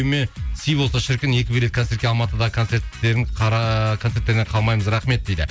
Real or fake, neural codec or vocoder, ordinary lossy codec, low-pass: real; none; none; none